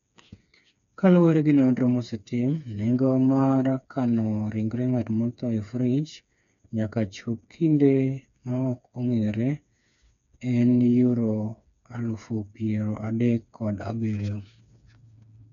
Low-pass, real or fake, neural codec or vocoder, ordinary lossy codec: 7.2 kHz; fake; codec, 16 kHz, 4 kbps, FreqCodec, smaller model; none